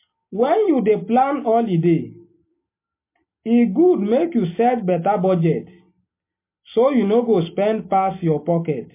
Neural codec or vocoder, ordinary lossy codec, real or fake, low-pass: none; MP3, 24 kbps; real; 3.6 kHz